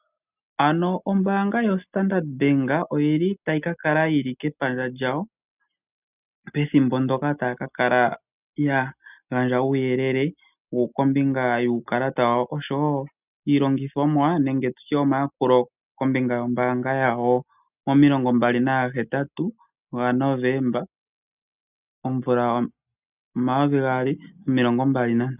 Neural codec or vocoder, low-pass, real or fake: none; 3.6 kHz; real